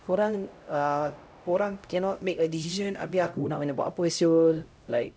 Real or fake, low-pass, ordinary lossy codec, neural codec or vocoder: fake; none; none; codec, 16 kHz, 0.5 kbps, X-Codec, HuBERT features, trained on LibriSpeech